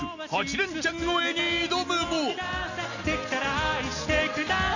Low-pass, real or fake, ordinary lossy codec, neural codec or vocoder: 7.2 kHz; real; none; none